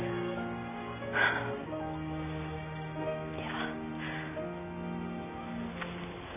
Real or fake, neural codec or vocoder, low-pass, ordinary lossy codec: real; none; 3.6 kHz; none